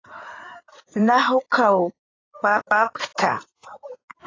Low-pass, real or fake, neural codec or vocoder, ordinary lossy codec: 7.2 kHz; fake; codec, 16 kHz in and 24 kHz out, 2.2 kbps, FireRedTTS-2 codec; AAC, 32 kbps